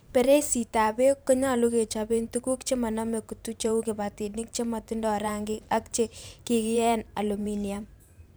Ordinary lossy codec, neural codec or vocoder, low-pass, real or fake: none; vocoder, 44.1 kHz, 128 mel bands every 512 samples, BigVGAN v2; none; fake